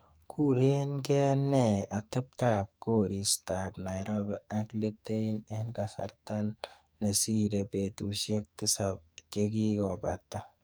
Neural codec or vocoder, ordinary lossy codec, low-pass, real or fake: codec, 44.1 kHz, 2.6 kbps, SNAC; none; none; fake